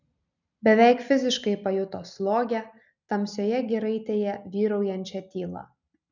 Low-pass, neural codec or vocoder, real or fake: 7.2 kHz; none; real